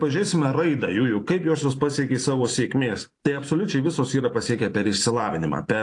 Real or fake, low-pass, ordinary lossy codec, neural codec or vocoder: fake; 10.8 kHz; AAC, 48 kbps; vocoder, 44.1 kHz, 128 mel bands every 512 samples, BigVGAN v2